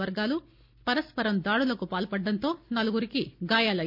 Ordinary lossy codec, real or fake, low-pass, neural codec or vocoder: none; real; 5.4 kHz; none